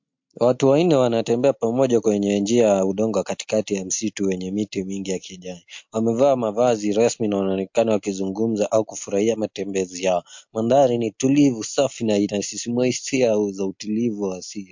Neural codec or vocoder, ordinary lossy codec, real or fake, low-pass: none; MP3, 48 kbps; real; 7.2 kHz